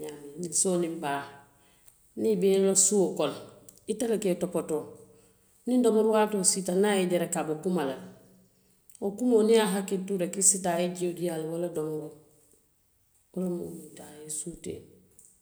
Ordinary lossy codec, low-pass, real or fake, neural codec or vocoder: none; none; real; none